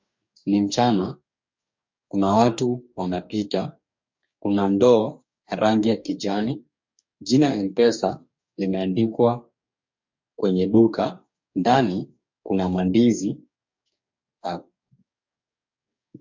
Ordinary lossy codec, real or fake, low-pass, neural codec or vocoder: MP3, 48 kbps; fake; 7.2 kHz; codec, 44.1 kHz, 2.6 kbps, DAC